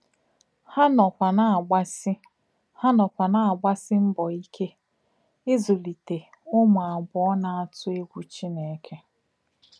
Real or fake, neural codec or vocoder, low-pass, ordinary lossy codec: real; none; none; none